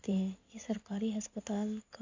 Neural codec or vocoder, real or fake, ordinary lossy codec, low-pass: codec, 16 kHz in and 24 kHz out, 2.2 kbps, FireRedTTS-2 codec; fake; AAC, 48 kbps; 7.2 kHz